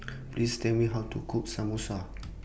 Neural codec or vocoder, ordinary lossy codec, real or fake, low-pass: none; none; real; none